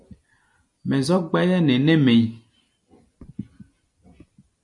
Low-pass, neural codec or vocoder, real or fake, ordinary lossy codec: 10.8 kHz; none; real; MP3, 64 kbps